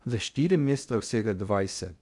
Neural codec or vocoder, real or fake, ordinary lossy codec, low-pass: codec, 16 kHz in and 24 kHz out, 0.6 kbps, FocalCodec, streaming, 2048 codes; fake; none; 10.8 kHz